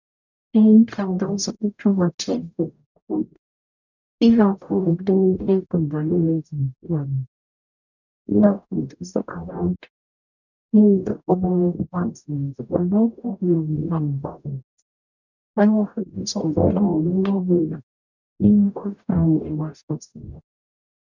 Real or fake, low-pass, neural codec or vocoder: fake; 7.2 kHz; codec, 44.1 kHz, 0.9 kbps, DAC